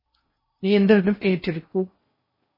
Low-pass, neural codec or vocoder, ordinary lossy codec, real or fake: 5.4 kHz; codec, 16 kHz in and 24 kHz out, 0.6 kbps, FocalCodec, streaming, 4096 codes; MP3, 24 kbps; fake